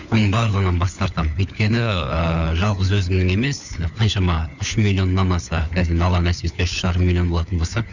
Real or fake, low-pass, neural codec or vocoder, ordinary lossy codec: fake; 7.2 kHz; codec, 16 kHz, 4 kbps, FunCodec, trained on LibriTTS, 50 frames a second; none